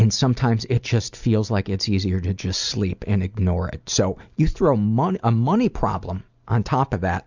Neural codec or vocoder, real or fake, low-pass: none; real; 7.2 kHz